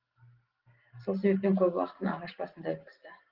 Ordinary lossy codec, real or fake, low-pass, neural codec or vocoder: Opus, 16 kbps; real; 5.4 kHz; none